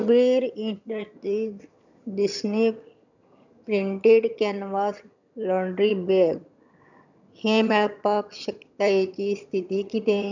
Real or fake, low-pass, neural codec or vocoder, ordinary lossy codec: fake; 7.2 kHz; vocoder, 22.05 kHz, 80 mel bands, HiFi-GAN; none